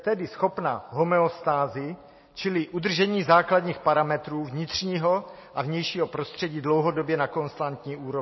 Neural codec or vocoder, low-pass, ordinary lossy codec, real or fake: none; 7.2 kHz; MP3, 24 kbps; real